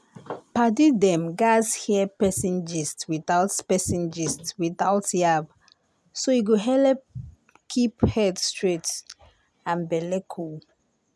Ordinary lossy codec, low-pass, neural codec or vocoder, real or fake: none; none; none; real